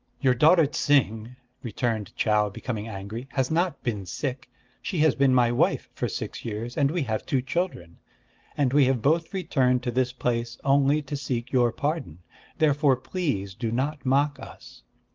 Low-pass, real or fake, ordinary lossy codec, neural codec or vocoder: 7.2 kHz; real; Opus, 32 kbps; none